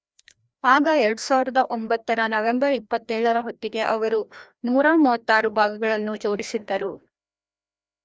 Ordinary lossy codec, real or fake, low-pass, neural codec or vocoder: none; fake; none; codec, 16 kHz, 1 kbps, FreqCodec, larger model